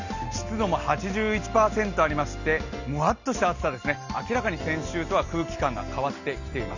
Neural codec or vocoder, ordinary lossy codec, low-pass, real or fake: none; none; 7.2 kHz; real